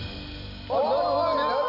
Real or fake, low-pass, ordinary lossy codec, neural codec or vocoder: real; 5.4 kHz; none; none